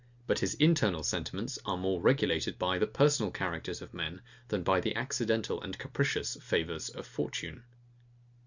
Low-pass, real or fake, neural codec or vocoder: 7.2 kHz; real; none